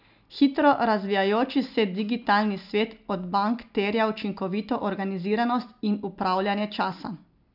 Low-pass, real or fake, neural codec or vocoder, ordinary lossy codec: 5.4 kHz; real; none; none